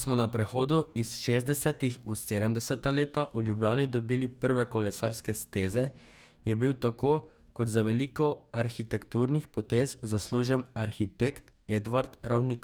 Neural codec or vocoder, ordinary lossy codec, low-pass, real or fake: codec, 44.1 kHz, 2.6 kbps, DAC; none; none; fake